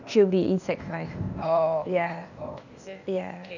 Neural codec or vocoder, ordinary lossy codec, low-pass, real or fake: codec, 16 kHz, 0.8 kbps, ZipCodec; none; 7.2 kHz; fake